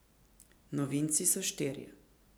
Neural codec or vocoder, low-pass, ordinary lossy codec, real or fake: vocoder, 44.1 kHz, 128 mel bands every 512 samples, BigVGAN v2; none; none; fake